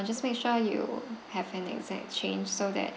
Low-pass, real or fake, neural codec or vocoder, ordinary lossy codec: none; real; none; none